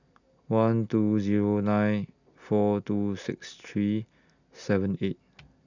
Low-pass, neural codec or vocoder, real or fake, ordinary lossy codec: 7.2 kHz; none; real; none